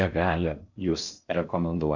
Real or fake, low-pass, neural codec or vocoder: fake; 7.2 kHz; codec, 16 kHz in and 24 kHz out, 0.6 kbps, FocalCodec, streaming, 4096 codes